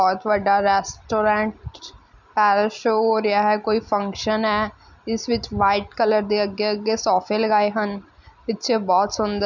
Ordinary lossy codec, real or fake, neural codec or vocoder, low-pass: none; real; none; 7.2 kHz